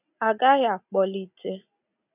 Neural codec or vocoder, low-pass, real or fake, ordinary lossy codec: none; 3.6 kHz; real; none